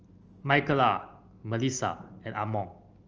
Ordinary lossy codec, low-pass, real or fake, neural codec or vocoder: Opus, 24 kbps; 7.2 kHz; real; none